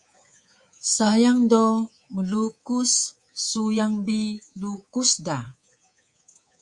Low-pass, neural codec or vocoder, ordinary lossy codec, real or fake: 10.8 kHz; codec, 24 kHz, 3.1 kbps, DualCodec; Opus, 64 kbps; fake